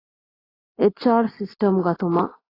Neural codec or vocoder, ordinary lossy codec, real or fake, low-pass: none; AAC, 24 kbps; real; 5.4 kHz